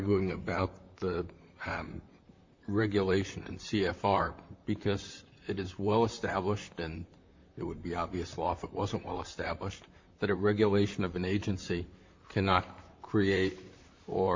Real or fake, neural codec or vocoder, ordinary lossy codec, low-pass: fake; vocoder, 44.1 kHz, 128 mel bands, Pupu-Vocoder; MP3, 48 kbps; 7.2 kHz